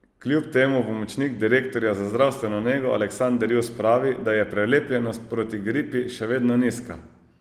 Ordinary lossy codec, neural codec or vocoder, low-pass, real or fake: Opus, 24 kbps; none; 14.4 kHz; real